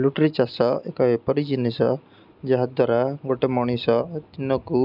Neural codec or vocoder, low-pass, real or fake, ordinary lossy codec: none; 5.4 kHz; real; none